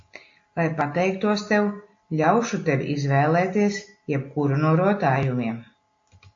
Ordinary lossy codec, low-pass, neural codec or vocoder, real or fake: AAC, 48 kbps; 7.2 kHz; none; real